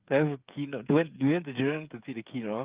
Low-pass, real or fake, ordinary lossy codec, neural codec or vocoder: 3.6 kHz; fake; Opus, 32 kbps; codec, 16 kHz, 8 kbps, FreqCodec, smaller model